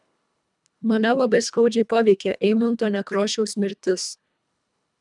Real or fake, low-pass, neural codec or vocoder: fake; 10.8 kHz; codec, 24 kHz, 1.5 kbps, HILCodec